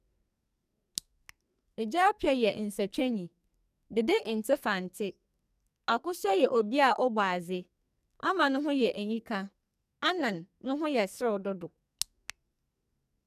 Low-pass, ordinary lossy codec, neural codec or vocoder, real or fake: 14.4 kHz; none; codec, 44.1 kHz, 2.6 kbps, SNAC; fake